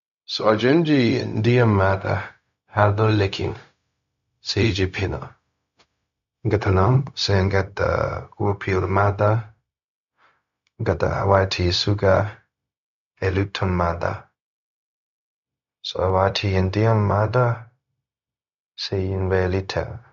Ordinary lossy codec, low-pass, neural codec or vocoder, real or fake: AAC, 96 kbps; 7.2 kHz; codec, 16 kHz, 0.4 kbps, LongCat-Audio-Codec; fake